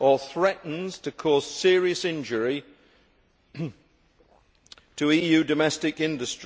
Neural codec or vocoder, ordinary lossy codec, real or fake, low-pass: none; none; real; none